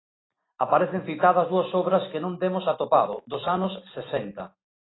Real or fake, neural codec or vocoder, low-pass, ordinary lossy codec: real; none; 7.2 kHz; AAC, 16 kbps